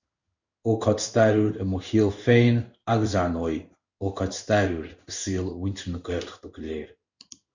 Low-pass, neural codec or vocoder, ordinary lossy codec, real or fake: 7.2 kHz; codec, 16 kHz in and 24 kHz out, 1 kbps, XY-Tokenizer; Opus, 64 kbps; fake